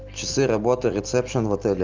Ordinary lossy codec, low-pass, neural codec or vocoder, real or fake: Opus, 24 kbps; 7.2 kHz; none; real